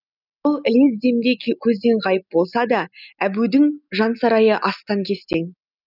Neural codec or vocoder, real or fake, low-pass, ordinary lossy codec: none; real; 5.4 kHz; none